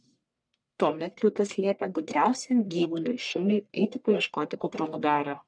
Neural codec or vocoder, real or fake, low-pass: codec, 44.1 kHz, 1.7 kbps, Pupu-Codec; fake; 9.9 kHz